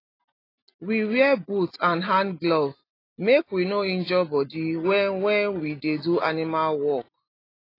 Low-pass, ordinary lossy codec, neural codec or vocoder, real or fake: 5.4 kHz; AAC, 24 kbps; none; real